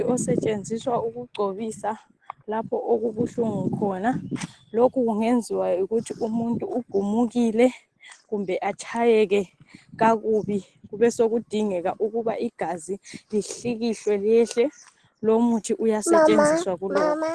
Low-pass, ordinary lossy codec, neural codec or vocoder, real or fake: 10.8 kHz; Opus, 16 kbps; none; real